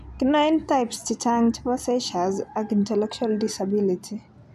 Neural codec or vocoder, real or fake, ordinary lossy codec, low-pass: none; real; none; 14.4 kHz